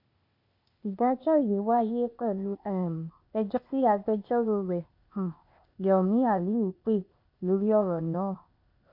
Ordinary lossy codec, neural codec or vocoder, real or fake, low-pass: MP3, 48 kbps; codec, 16 kHz, 0.8 kbps, ZipCodec; fake; 5.4 kHz